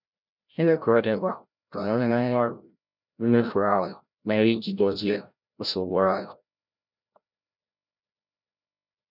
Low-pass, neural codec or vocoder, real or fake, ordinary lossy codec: 5.4 kHz; codec, 16 kHz, 0.5 kbps, FreqCodec, larger model; fake; none